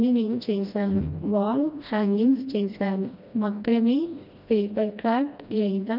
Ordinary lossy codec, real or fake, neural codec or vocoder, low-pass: none; fake; codec, 16 kHz, 1 kbps, FreqCodec, smaller model; 5.4 kHz